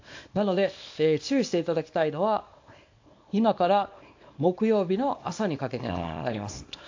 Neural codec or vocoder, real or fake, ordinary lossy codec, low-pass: codec, 24 kHz, 0.9 kbps, WavTokenizer, small release; fake; AAC, 48 kbps; 7.2 kHz